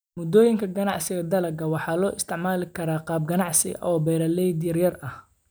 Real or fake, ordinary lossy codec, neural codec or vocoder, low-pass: real; none; none; none